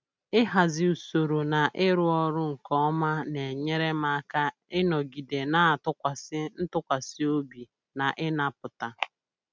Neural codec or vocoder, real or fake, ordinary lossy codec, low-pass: none; real; none; none